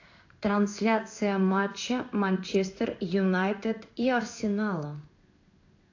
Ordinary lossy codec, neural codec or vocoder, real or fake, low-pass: AAC, 48 kbps; codec, 16 kHz in and 24 kHz out, 1 kbps, XY-Tokenizer; fake; 7.2 kHz